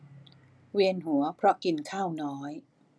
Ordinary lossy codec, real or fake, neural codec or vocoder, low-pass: none; real; none; none